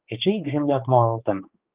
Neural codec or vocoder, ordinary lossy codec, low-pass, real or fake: codec, 16 kHz, 4 kbps, X-Codec, HuBERT features, trained on balanced general audio; Opus, 24 kbps; 3.6 kHz; fake